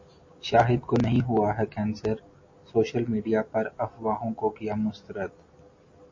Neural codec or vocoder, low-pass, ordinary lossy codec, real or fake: none; 7.2 kHz; MP3, 32 kbps; real